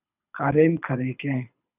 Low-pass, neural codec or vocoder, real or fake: 3.6 kHz; codec, 24 kHz, 6 kbps, HILCodec; fake